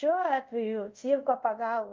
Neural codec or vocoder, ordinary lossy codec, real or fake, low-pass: codec, 24 kHz, 0.5 kbps, DualCodec; Opus, 24 kbps; fake; 7.2 kHz